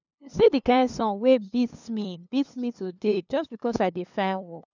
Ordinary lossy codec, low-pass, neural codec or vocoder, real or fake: none; 7.2 kHz; codec, 16 kHz, 2 kbps, FunCodec, trained on LibriTTS, 25 frames a second; fake